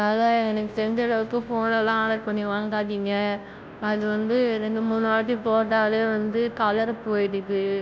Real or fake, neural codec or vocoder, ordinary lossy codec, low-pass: fake; codec, 16 kHz, 0.5 kbps, FunCodec, trained on Chinese and English, 25 frames a second; none; none